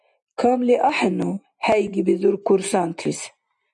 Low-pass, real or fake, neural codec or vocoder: 10.8 kHz; real; none